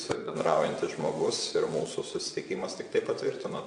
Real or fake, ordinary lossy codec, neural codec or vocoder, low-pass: fake; AAC, 64 kbps; vocoder, 22.05 kHz, 80 mel bands, WaveNeXt; 9.9 kHz